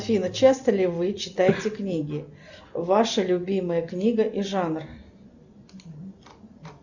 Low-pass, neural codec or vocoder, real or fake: 7.2 kHz; none; real